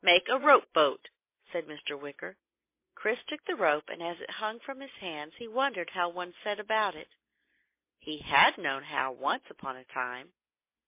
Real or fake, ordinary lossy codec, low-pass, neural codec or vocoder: real; MP3, 24 kbps; 3.6 kHz; none